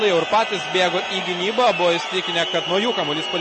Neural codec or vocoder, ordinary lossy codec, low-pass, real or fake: none; MP3, 32 kbps; 10.8 kHz; real